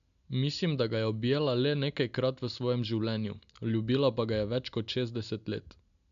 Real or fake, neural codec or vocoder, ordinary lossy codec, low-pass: real; none; none; 7.2 kHz